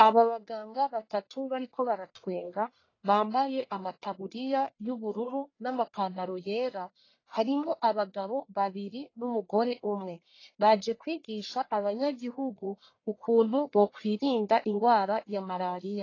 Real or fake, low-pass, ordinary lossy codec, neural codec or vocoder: fake; 7.2 kHz; AAC, 32 kbps; codec, 44.1 kHz, 1.7 kbps, Pupu-Codec